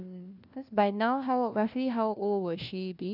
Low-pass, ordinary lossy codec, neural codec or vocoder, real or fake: 5.4 kHz; none; codec, 16 kHz, 1 kbps, FunCodec, trained on LibriTTS, 50 frames a second; fake